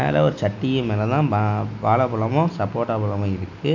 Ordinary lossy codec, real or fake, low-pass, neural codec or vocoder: none; real; 7.2 kHz; none